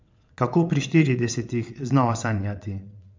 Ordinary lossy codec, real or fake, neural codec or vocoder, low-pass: MP3, 64 kbps; fake; vocoder, 22.05 kHz, 80 mel bands, WaveNeXt; 7.2 kHz